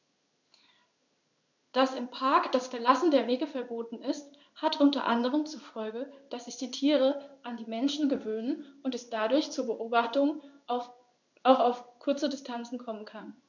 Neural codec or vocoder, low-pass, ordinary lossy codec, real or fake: codec, 16 kHz in and 24 kHz out, 1 kbps, XY-Tokenizer; 7.2 kHz; none; fake